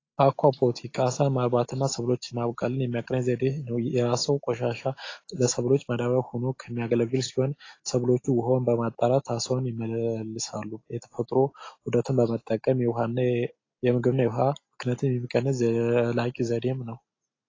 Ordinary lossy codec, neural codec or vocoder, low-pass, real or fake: AAC, 32 kbps; none; 7.2 kHz; real